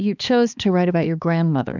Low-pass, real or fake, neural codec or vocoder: 7.2 kHz; fake; codec, 16 kHz, 2 kbps, X-Codec, HuBERT features, trained on balanced general audio